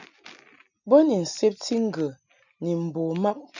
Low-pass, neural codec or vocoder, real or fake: 7.2 kHz; none; real